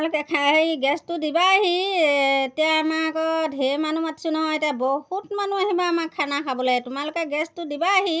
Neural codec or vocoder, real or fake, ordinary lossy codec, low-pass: none; real; none; none